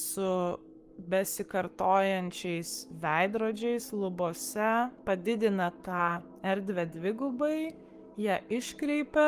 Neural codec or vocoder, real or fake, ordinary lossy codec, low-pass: codec, 44.1 kHz, 7.8 kbps, Pupu-Codec; fake; Opus, 32 kbps; 14.4 kHz